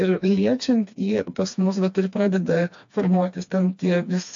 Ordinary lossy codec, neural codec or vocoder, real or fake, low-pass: AAC, 64 kbps; codec, 16 kHz, 2 kbps, FreqCodec, smaller model; fake; 7.2 kHz